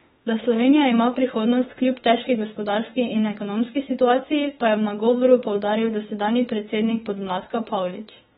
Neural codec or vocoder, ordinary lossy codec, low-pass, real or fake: autoencoder, 48 kHz, 32 numbers a frame, DAC-VAE, trained on Japanese speech; AAC, 16 kbps; 19.8 kHz; fake